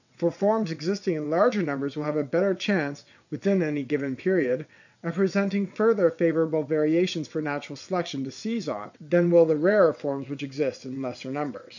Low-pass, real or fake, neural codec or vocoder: 7.2 kHz; fake; vocoder, 22.05 kHz, 80 mel bands, WaveNeXt